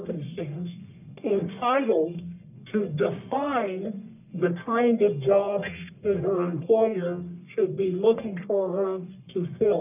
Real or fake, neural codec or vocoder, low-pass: fake; codec, 44.1 kHz, 1.7 kbps, Pupu-Codec; 3.6 kHz